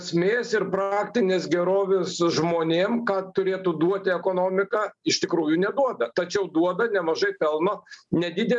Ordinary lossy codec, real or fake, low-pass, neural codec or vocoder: MP3, 96 kbps; real; 10.8 kHz; none